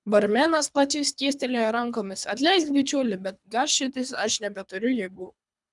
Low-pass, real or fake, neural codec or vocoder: 10.8 kHz; fake; codec, 24 kHz, 3 kbps, HILCodec